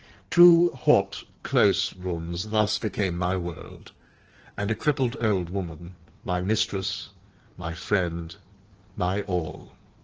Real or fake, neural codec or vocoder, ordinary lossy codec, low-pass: fake; codec, 16 kHz in and 24 kHz out, 1.1 kbps, FireRedTTS-2 codec; Opus, 16 kbps; 7.2 kHz